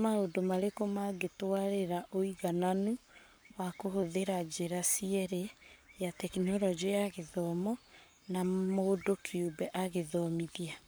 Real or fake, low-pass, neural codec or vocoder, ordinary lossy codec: fake; none; codec, 44.1 kHz, 7.8 kbps, Pupu-Codec; none